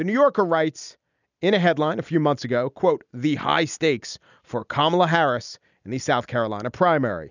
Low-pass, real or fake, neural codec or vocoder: 7.2 kHz; real; none